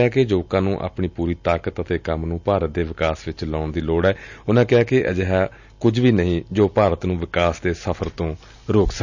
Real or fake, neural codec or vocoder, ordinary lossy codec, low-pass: real; none; none; 7.2 kHz